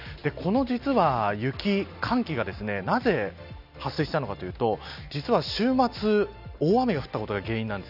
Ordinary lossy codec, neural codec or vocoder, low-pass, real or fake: none; none; 5.4 kHz; real